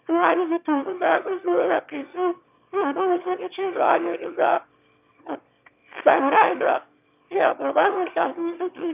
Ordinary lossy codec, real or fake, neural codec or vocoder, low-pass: none; fake; autoencoder, 22.05 kHz, a latent of 192 numbers a frame, VITS, trained on one speaker; 3.6 kHz